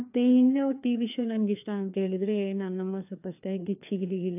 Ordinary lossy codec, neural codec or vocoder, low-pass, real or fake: AAC, 32 kbps; codec, 16 kHz, 2 kbps, FreqCodec, larger model; 3.6 kHz; fake